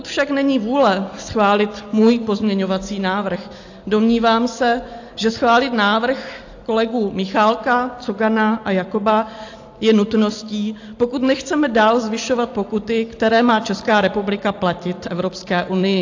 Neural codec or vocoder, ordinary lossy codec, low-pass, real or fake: none; AAC, 48 kbps; 7.2 kHz; real